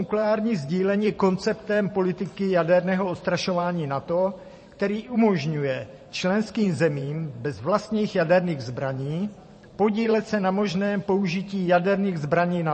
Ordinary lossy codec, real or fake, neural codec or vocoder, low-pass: MP3, 32 kbps; fake; vocoder, 44.1 kHz, 128 mel bands every 512 samples, BigVGAN v2; 10.8 kHz